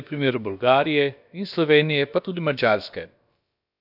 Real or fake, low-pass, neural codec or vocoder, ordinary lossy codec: fake; 5.4 kHz; codec, 16 kHz, about 1 kbps, DyCAST, with the encoder's durations; none